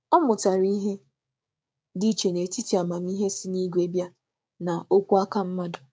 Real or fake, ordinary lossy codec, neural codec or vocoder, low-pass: fake; none; codec, 16 kHz, 6 kbps, DAC; none